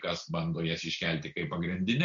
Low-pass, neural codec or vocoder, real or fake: 7.2 kHz; none; real